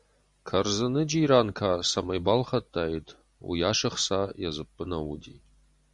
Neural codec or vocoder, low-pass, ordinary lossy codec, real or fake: none; 10.8 kHz; Opus, 64 kbps; real